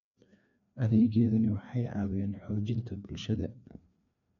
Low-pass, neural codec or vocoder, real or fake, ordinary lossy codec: 7.2 kHz; codec, 16 kHz, 2 kbps, FreqCodec, larger model; fake; none